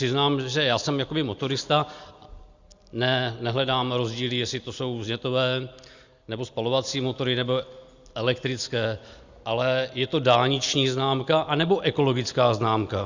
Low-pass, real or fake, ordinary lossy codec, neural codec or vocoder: 7.2 kHz; real; Opus, 64 kbps; none